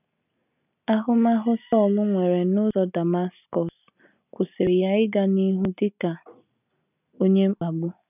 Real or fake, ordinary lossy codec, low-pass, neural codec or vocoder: real; none; 3.6 kHz; none